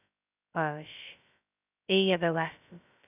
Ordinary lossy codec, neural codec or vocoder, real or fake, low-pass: none; codec, 16 kHz, 0.2 kbps, FocalCodec; fake; 3.6 kHz